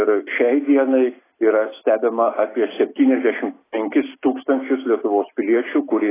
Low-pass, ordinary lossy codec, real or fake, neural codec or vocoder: 3.6 kHz; AAC, 16 kbps; real; none